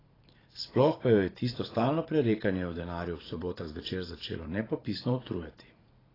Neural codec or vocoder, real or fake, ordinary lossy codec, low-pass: vocoder, 44.1 kHz, 80 mel bands, Vocos; fake; AAC, 24 kbps; 5.4 kHz